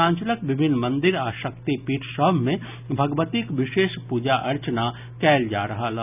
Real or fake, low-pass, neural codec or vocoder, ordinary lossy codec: real; 3.6 kHz; none; none